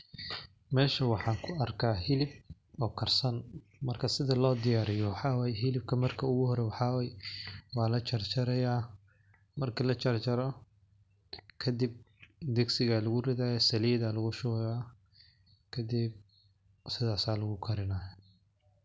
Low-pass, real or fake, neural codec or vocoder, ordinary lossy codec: none; real; none; none